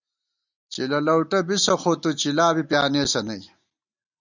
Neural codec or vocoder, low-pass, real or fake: none; 7.2 kHz; real